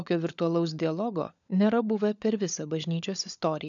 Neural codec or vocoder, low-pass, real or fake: codec, 16 kHz, 16 kbps, FunCodec, trained on LibriTTS, 50 frames a second; 7.2 kHz; fake